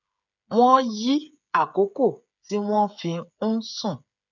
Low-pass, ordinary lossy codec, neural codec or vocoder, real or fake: 7.2 kHz; none; codec, 16 kHz, 8 kbps, FreqCodec, smaller model; fake